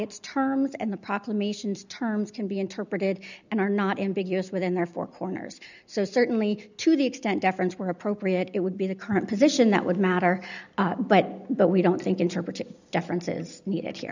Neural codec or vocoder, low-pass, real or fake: none; 7.2 kHz; real